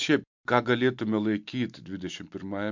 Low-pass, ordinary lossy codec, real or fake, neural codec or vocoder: 7.2 kHz; MP3, 64 kbps; real; none